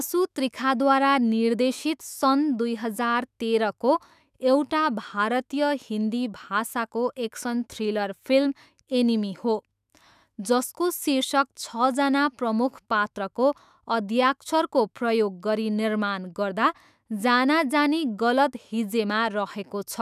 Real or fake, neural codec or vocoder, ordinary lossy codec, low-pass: fake; autoencoder, 48 kHz, 128 numbers a frame, DAC-VAE, trained on Japanese speech; none; 14.4 kHz